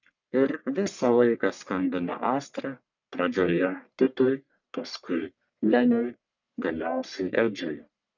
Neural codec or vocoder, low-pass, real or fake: codec, 44.1 kHz, 1.7 kbps, Pupu-Codec; 7.2 kHz; fake